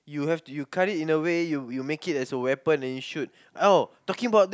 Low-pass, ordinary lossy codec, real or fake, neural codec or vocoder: none; none; real; none